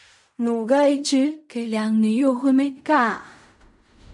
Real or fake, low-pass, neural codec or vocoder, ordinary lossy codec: fake; 10.8 kHz; codec, 16 kHz in and 24 kHz out, 0.4 kbps, LongCat-Audio-Codec, fine tuned four codebook decoder; MP3, 64 kbps